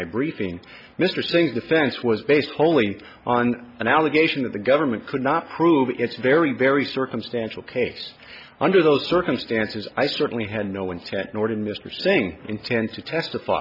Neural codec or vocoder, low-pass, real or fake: none; 5.4 kHz; real